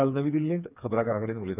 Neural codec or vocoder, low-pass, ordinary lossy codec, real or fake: codec, 16 kHz, 4 kbps, FreqCodec, smaller model; 3.6 kHz; none; fake